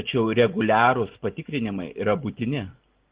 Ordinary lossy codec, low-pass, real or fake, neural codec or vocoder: Opus, 16 kbps; 3.6 kHz; real; none